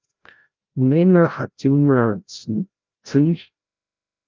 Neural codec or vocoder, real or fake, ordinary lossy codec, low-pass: codec, 16 kHz, 0.5 kbps, FreqCodec, larger model; fake; Opus, 24 kbps; 7.2 kHz